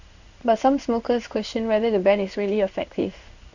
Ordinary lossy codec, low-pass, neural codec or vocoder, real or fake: none; 7.2 kHz; codec, 16 kHz in and 24 kHz out, 1 kbps, XY-Tokenizer; fake